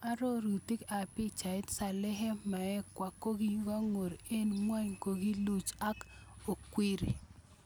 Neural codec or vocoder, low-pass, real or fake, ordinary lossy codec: none; none; real; none